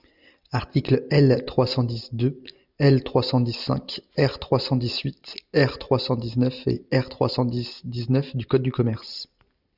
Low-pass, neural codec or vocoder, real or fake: 5.4 kHz; none; real